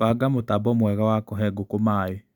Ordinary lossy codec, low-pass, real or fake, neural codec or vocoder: none; 19.8 kHz; real; none